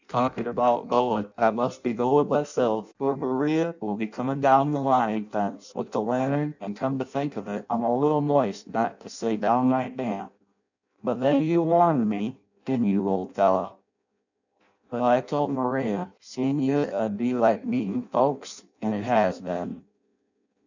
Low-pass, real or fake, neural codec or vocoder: 7.2 kHz; fake; codec, 16 kHz in and 24 kHz out, 0.6 kbps, FireRedTTS-2 codec